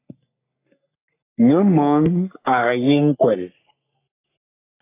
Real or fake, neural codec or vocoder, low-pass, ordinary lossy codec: fake; codec, 44.1 kHz, 3.4 kbps, Pupu-Codec; 3.6 kHz; AAC, 24 kbps